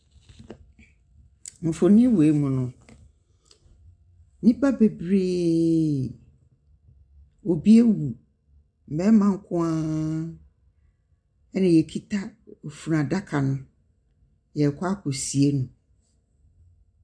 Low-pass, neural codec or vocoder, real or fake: 9.9 kHz; none; real